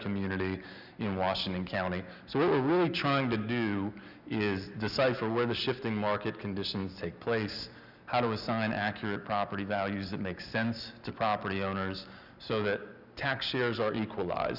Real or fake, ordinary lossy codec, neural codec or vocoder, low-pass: real; Opus, 64 kbps; none; 5.4 kHz